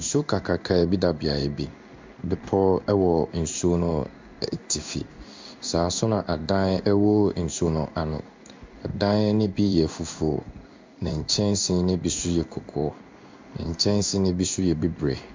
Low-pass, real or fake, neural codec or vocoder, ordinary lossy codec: 7.2 kHz; fake; codec, 16 kHz in and 24 kHz out, 1 kbps, XY-Tokenizer; MP3, 64 kbps